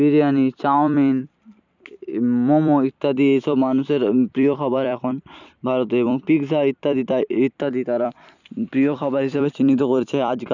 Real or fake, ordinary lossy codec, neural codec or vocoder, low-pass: fake; none; vocoder, 44.1 kHz, 128 mel bands every 256 samples, BigVGAN v2; 7.2 kHz